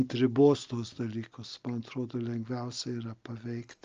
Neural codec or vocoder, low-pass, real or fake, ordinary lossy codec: none; 7.2 kHz; real; Opus, 24 kbps